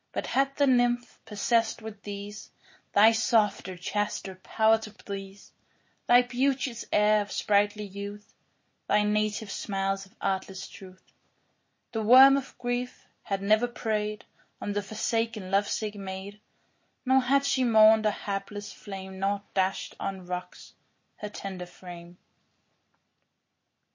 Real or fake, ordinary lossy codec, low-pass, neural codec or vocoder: real; MP3, 32 kbps; 7.2 kHz; none